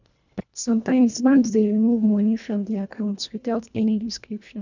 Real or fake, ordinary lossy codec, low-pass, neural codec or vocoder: fake; none; 7.2 kHz; codec, 24 kHz, 1.5 kbps, HILCodec